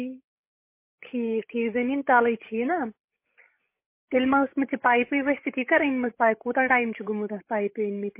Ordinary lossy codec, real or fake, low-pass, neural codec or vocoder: MP3, 24 kbps; fake; 3.6 kHz; codec, 16 kHz, 16 kbps, FreqCodec, larger model